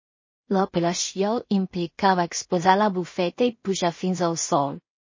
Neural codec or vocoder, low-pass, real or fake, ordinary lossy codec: codec, 16 kHz in and 24 kHz out, 0.4 kbps, LongCat-Audio-Codec, two codebook decoder; 7.2 kHz; fake; MP3, 32 kbps